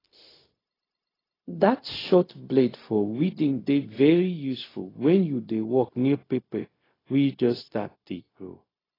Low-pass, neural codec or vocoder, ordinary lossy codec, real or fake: 5.4 kHz; codec, 16 kHz, 0.4 kbps, LongCat-Audio-Codec; AAC, 24 kbps; fake